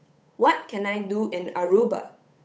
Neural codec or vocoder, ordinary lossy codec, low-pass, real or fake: codec, 16 kHz, 8 kbps, FunCodec, trained on Chinese and English, 25 frames a second; none; none; fake